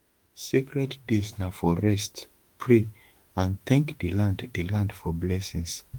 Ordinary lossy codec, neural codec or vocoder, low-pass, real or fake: Opus, 32 kbps; autoencoder, 48 kHz, 32 numbers a frame, DAC-VAE, trained on Japanese speech; 19.8 kHz; fake